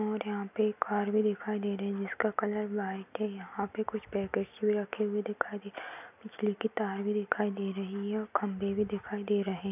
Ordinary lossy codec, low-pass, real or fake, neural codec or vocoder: none; 3.6 kHz; real; none